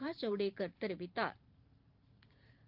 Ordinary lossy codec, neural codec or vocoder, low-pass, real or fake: Opus, 32 kbps; vocoder, 44.1 kHz, 128 mel bands every 512 samples, BigVGAN v2; 5.4 kHz; fake